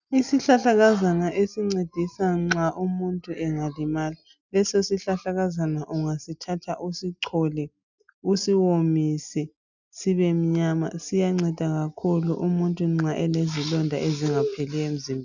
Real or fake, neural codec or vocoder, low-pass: real; none; 7.2 kHz